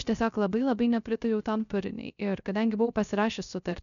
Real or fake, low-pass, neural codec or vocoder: fake; 7.2 kHz; codec, 16 kHz, 0.3 kbps, FocalCodec